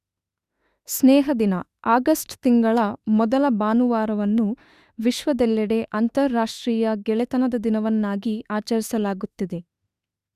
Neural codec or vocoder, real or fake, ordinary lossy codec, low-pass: autoencoder, 48 kHz, 32 numbers a frame, DAC-VAE, trained on Japanese speech; fake; Opus, 64 kbps; 14.4 kHz